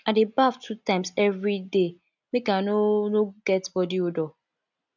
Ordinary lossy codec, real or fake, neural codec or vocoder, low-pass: none; real; none; 7.2 kHz